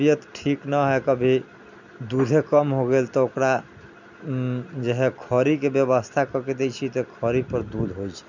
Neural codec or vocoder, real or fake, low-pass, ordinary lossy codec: none; real; 7.2 kHz; none